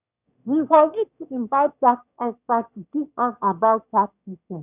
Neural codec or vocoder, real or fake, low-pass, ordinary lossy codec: autoencoder, 22.05 kHz, a latent of 192 numbers a frame, VITS, trained on one speaker; fake; 3.6 kHz; none